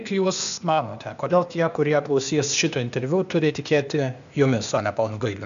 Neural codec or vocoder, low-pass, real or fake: codec, 16 kHz, 0.8 kbps, ZipCodec; 7.2 kHz; fake